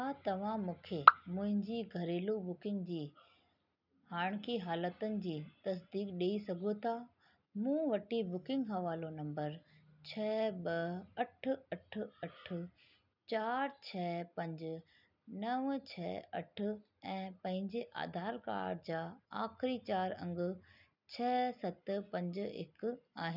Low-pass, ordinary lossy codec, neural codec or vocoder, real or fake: 5.4 kHz; none; none; real